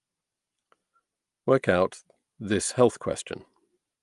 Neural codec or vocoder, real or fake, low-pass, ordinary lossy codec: none; real; 10.8 kHz; Opus, 32 kbps